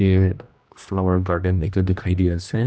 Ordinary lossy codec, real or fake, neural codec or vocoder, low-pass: none; fake; codec, 16 kHz, 1 kbps, X-Codec, HuBERT features, trained on general audio; none